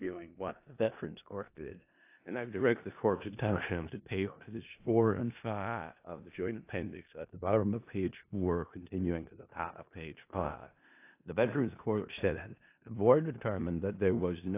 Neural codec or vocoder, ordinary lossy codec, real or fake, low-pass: codec, 16 kHz in and 24 kHz out, 0.4 kbps, LongCat-Audio-Codec, four codebook decoder; AAC, 24 kbps; fake; 3.6 kHz